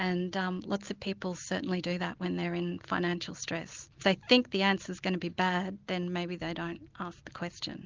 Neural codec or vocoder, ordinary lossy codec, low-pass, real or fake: none; Opus, 24 kbps; 7.2 kHz; real